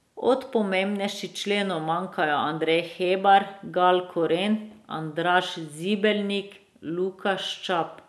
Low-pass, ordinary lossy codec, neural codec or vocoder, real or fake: none; none; none; real